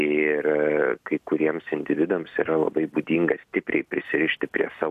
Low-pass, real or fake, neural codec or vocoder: 14.4 kHz; real; none